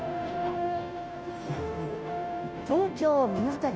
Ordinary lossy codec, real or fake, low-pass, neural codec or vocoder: none; fake; none; codec, 16 kHz, 0.5 kbps, FunCodec, trained on Chinese and English, 25 frames a second